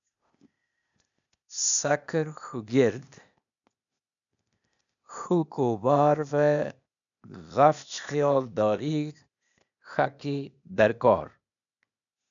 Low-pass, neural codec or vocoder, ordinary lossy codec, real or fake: 7.2 kHz; codec, 16 kHz, 0.8 kbps, ZipCodec; MP3, 96 kbps; fake